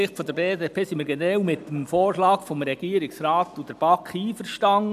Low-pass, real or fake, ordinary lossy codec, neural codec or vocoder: 14.4 kHz; real; none; none